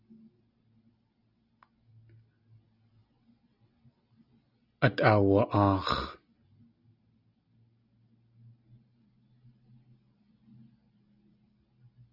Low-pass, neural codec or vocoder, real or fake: 5.4 kHz; none; real